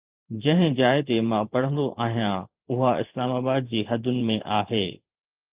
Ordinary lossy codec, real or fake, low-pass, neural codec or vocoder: Opus, 32 kbps; fake; 3.6 kHz; vocoder, 44.1 kHz, 128 mel bands every 512 samples, BigVGAN v2